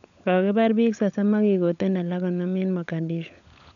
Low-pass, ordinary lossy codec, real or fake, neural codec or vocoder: 7.2 kHz; none; fake; codec, 16 kHz, 16 kbps, FunCodec, trained on LibriTTS, 50 frames a second